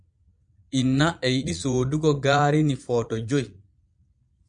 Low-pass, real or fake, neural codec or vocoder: 9.9 kHz; fake; vocoder, 22.05 kHz, 80 mel bands, Vocos